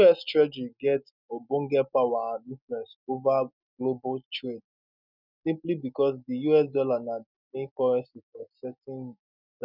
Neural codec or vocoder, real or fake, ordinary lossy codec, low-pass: none; real; none; 5.4 kHz